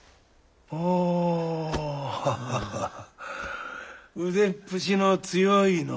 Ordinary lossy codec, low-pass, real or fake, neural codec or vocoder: none; none; real; none